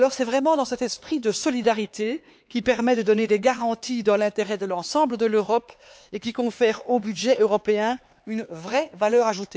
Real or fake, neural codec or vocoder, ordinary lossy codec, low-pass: fake; codec, 16 kHz, 4 kbps, X-Codec, HuBERT features, trained on LibriSpeech; none; none